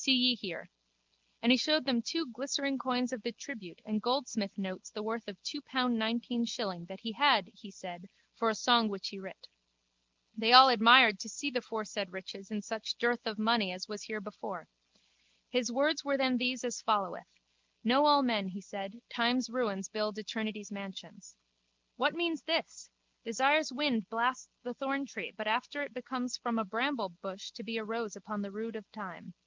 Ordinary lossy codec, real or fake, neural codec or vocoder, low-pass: Opus, 24 kbps; real; none; 7.2 kHz